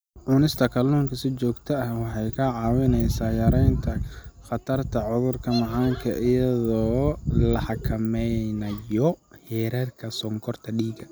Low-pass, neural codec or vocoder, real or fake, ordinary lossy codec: none; none; real; none